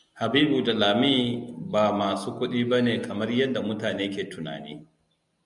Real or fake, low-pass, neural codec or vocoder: real; 10.8 kHz; none